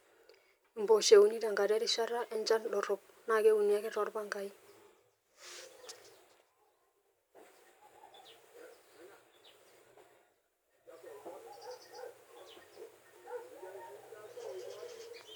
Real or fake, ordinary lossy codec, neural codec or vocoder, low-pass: real; none; none; none